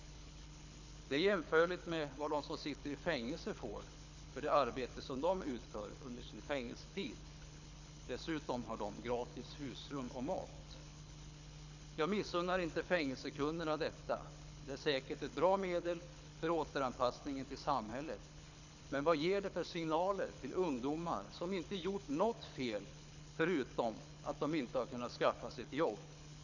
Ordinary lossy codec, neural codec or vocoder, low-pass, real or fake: none; codec, 24 kHz, 6 kbps, HILCodec; 7.2 kHz; fake